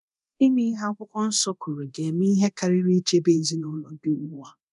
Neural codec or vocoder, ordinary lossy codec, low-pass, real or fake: codec, 24 kHz, 0.9 kbps, DualCodec; none; 10.8 kHz; fake